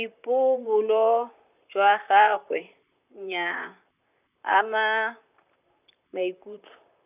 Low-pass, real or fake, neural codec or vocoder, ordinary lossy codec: 3.6 kHz; fake; vocoder, 44.1 kHz, 128 mel bands, Pupu-Vocoder; none